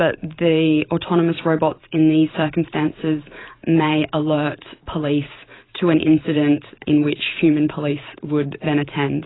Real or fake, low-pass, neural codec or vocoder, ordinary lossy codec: fake; 7.2 kHz; vocoder, 44.1 kHz, 128 mel bands every 512 samples, BigVGAN v2; AAC, 16 kbps